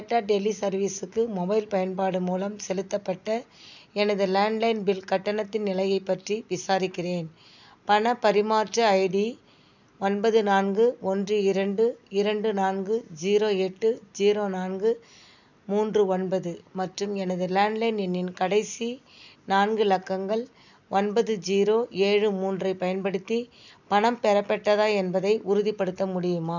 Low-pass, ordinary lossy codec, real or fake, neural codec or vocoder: 7.2 kHz; none; real; none